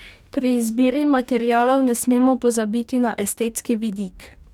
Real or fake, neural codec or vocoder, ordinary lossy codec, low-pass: fake; codec, 44.1 kHz, 2.6 kbps, DAC; none; 19.8 kHz